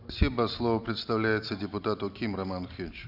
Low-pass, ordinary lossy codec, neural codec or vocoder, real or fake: 5.4 kHz; none; none; real